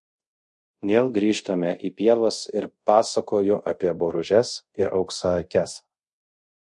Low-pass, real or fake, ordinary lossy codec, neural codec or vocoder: 10.8 kHz; fake; MP3, 48 kbps; codec, 24 kHz, 0.5 kbps, DualCodec